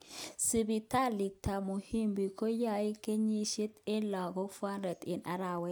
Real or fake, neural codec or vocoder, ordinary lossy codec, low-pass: real; none; none; none